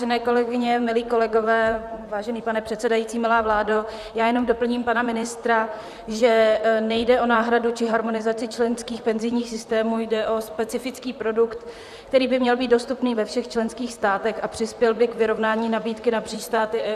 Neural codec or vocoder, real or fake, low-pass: vocoder, 44.1 kHz, 128 mel bands, Pupu-Vocoder; fake; 14.4 kHz